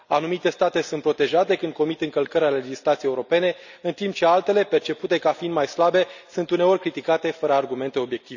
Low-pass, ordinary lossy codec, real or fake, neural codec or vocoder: 7.2 kHz; none; real; none